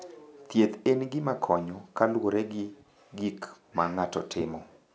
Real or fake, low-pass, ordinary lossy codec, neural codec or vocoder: real; none; none; none